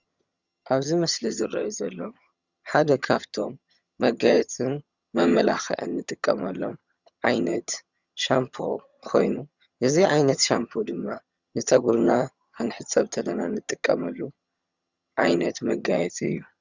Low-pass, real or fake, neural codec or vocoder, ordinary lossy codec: 7.2 kHz; fake; vocoder, 22.05 kHz, 80 mel bands, HiFi-GAN; Opus, 64 kbps